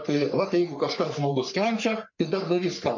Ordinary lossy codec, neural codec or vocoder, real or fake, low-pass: MP3, 64 kbps; codec, 44.1 kHz, 3.4 kbps, Pupu-Codec; fake; 7.2 kHz